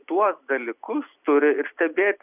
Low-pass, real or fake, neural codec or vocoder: 3.6 kHz; real; none